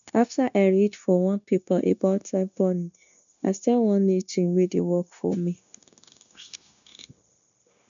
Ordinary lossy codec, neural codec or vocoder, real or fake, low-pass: none; codec, 16 kHz, 0.9 kbps, LongCat-Audio-Codec; fake; 7.2 kHz